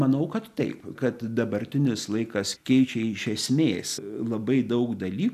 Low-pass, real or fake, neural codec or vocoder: 14.4 kHz; real; none